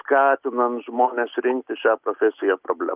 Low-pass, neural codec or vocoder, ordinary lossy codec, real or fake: 3.6 kHz; none; Opus, 24 kbps; real